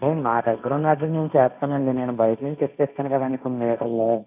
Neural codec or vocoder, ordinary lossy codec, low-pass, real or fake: codec, 16 kHz, 1.1 kbps, Voila-Tokenizer; none; 3.6 kHz; fake